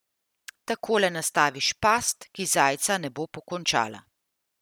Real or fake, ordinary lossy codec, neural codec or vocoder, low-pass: real; none; none; none